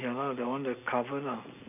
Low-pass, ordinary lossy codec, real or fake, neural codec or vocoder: 3.6 kHz; none; real; none